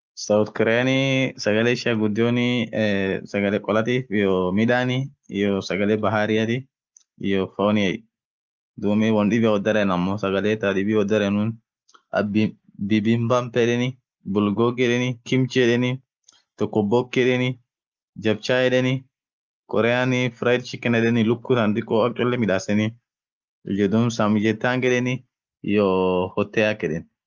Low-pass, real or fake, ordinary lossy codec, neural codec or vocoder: 7.2 kHz; real; Opus, 24 kbps; none